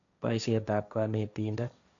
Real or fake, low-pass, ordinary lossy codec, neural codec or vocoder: fake; 7.2 kHz; none; codec, 16 kHz, 1.1 kbps, Voila-Tokenizer